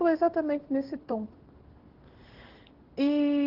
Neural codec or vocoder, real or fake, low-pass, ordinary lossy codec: codec, 16 kHz in and 24 kHz out, 1 kbps, XY-Tokenizer; fake; 5.4 kHz; Opus, 16 kbps